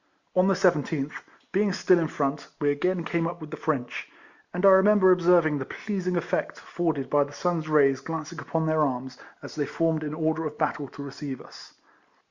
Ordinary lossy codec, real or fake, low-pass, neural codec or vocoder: Opus, 64 kbps; real; 7.2 kHz; none